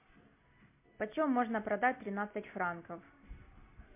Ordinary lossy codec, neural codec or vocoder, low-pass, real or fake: MP3, 32 kbps; none; 3.6 kHz; real